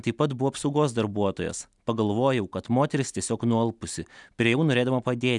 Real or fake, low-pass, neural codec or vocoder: real; 10.8 kHz; none